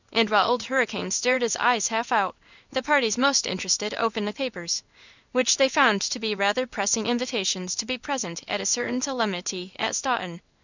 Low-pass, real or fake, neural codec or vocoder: 7.2 kHz; fake; codec, 16 kHz in and 24 kHz out, 1 kbps, XY-Tokenizer